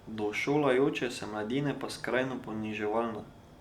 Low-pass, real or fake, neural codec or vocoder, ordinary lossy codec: 19.8 kHz; real; none; Opus, 64 kbps